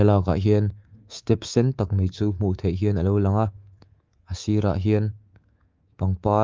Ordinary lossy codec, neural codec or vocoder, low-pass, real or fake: Opus, 24 kbps; codec, 24 kHz, 3.1 kbps, DualCodec; 7.2 kHz; fake